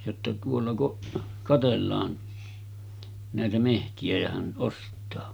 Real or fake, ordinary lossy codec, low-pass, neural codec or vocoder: real; none; none; none